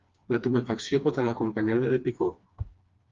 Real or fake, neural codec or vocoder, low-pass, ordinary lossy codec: fake; codec, 16 kHz, 2 kbps, FreqCodec, smaller model; 7.2 kHz; Opus, 32 kbps